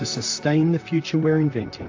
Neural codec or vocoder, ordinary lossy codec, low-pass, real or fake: vocoder, 44.1 kHz, 128 mel bands, Pupu-Vocoder; AAC, 48 kbps; 7.2 kHz; fake